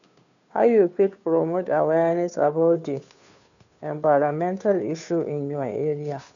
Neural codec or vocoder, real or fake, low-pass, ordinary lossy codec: codec, 16 kHz, 2 kbps, FunCodec, trained on Chinese and English, 25 frames a second; fake; 7.2 kHz; none